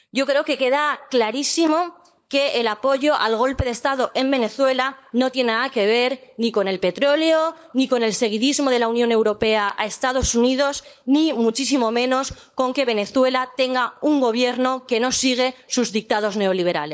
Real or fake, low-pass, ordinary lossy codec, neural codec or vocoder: fake; none; none; codec, 16 kHz, 16 kbps, FunCodec, trained on LibriTTS, 50 frames a second